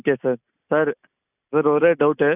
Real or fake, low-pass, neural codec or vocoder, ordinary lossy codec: real; 3.6 kHz; none; none